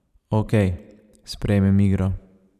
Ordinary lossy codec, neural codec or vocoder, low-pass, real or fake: none; vocoder, 44.1 kHz, 128 mel bands every 512 samples, BigVGAN v2; 14.4 kHz; fake